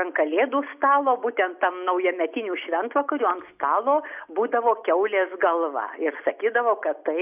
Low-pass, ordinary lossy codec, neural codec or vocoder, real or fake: 3.6 kHz; AAC, 32 kbps; none; real